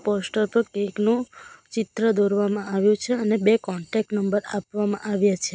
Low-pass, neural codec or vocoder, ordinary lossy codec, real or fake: none; none; none; real